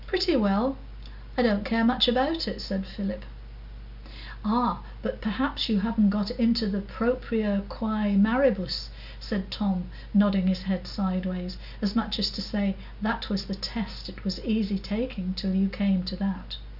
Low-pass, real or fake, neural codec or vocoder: 5.4 kHz; real; none